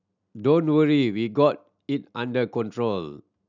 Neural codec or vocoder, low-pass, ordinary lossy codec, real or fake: none; 7.2 kHz; none; real